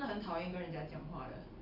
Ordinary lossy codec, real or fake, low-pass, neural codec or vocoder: none; real; 5.4 kHz; none